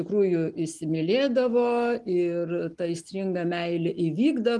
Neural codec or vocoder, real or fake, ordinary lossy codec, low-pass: none; real; Opus, 24 kbps; 10.8 kHz